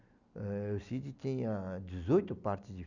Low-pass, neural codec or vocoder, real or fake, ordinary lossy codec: 7.2 kHz; none; real; none